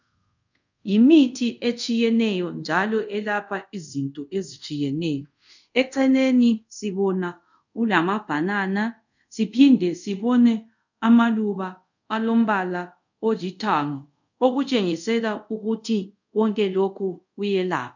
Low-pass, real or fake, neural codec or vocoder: 7.2 kHz; fake; codec, 24 kHz, 0.5 kbps, DualCodec